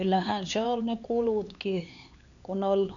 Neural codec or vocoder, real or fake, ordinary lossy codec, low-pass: codec, 16 kHz, 4 kbps, X-Codec, HuBERT features, trained on LibriSpeech; fake; none; 7.2 kHz